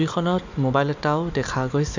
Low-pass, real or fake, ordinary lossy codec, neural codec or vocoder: 7.2 kHz; real; none; none